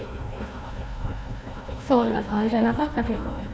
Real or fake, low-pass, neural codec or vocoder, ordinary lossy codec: fake; none; codec, 16 kHz, 1 kbps, FunCodec, trained on Chinese and English, 50 frames a second; none